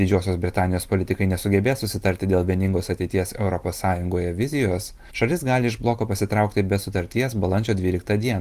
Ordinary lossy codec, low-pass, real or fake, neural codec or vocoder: Opus, 24 kbps; 14.4 kHz; real; none